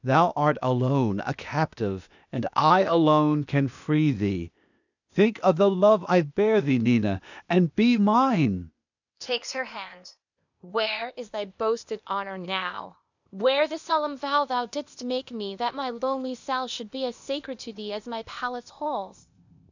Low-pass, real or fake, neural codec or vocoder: 7.2 kHz; fake; codec, 16 kHz, 0.8 kbps, ZipCodec